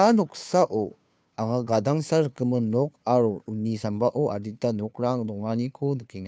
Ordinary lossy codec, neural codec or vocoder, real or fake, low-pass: none; codec, 16 kHz, 2 kbps, FunCodec, trained on Chinese and English, 25 frames a second; fake; none